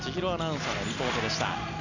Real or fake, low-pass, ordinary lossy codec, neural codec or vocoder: real; 7.2 kHz; none; none